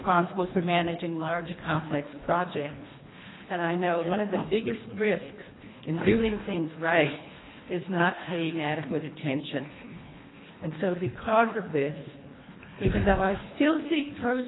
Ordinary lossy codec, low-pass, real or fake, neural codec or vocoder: AAC, 16 kbps; 7.2 kHz; fake; codec, 24 kHz, 1.5 kbps, HILCodec